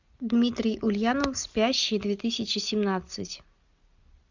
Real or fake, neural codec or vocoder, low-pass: real; none; 7.2 kHz